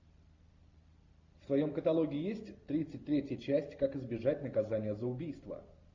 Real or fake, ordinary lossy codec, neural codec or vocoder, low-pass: real; MP3, 48 kbps; none; 7.2 kHz